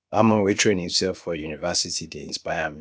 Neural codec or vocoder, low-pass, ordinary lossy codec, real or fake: codec, 16 kHz, about 1 kbps, DyCAST, with the encoder's durations; none; none; fake